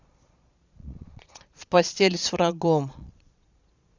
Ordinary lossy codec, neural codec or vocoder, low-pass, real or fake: Opus, 64 kbps; vocoder, 22.05 kHz, 80 mel bands, Vocos; 7.2 kHz; fake